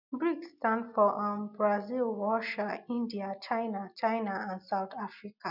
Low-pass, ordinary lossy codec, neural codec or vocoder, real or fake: 5.4 kHz; none; none; real